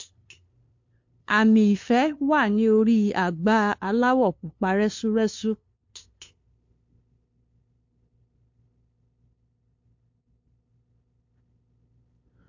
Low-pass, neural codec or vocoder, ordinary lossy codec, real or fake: 7.2 kHz; codec, 16 kHz, 2 kbps, FunCodec, trained on LibriTTS, 25 frames a second; MP3, 48 kbps; fake